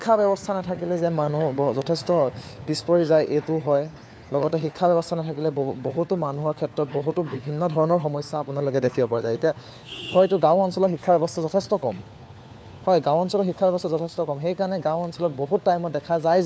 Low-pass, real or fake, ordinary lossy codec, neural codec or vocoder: none; fake; none; codec, 16 kHz, 4 kbps, FunCodec, trained on LibriTTS, 50 frames a second